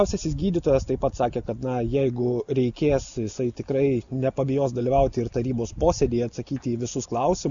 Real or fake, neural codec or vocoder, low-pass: real; none; 7.2 kHz